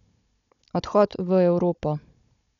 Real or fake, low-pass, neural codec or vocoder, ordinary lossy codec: fake; 7.2 kHz; codec, 16 kHz, 16 kbps, FunCodec, trained on Chinese and English, 50 frames a second; none